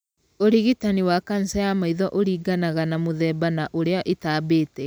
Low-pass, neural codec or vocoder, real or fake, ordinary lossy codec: none; none; real; none